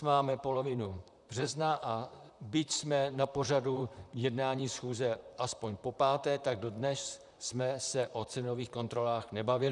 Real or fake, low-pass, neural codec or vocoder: fake; 10.8 kHz; vocoder, 44.1 kHz, 128 mel bands, Pupu-Vocoder